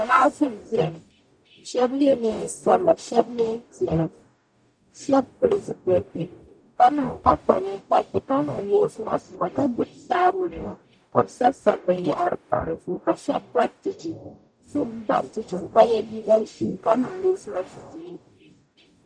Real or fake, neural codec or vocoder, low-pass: fake; codec, 44.1 kHz, 0.9 kbps, DAC; 9.9 kHz